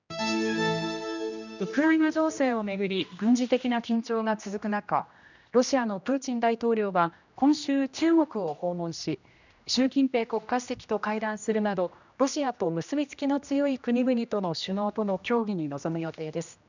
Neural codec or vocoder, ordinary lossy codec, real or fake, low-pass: codec, 16 kHz, 1 kbps, X-Codec, HuBERT features, trained on general audio; none; fake; 7.2 kHz